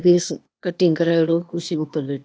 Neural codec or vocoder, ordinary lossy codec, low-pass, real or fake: codec, 16 kHz, 0.8 kbps, ZipCodec; none; none; fake